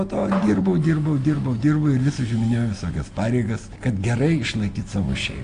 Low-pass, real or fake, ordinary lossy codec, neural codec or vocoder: 9.9 kHz; real; AAC, 48 kbps; none